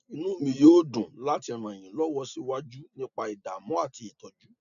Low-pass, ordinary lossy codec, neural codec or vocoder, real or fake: 7.2 kHz; none; none; real